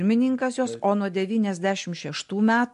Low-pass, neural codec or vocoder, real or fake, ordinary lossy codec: 10.8 kHz; none; real; MP3, 64 kbps